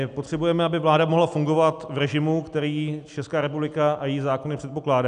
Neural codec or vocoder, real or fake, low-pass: none; real; 9.9 kHz